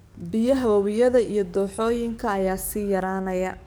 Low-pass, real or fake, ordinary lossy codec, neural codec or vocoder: none; fake; none; codec, 44.1 kHz, 7.8 kbps, DAC